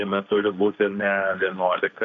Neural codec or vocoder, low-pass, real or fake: codec, 16 kHz, 1.1 kbps, Voila-Tokenizer; 7.2 kHz; fake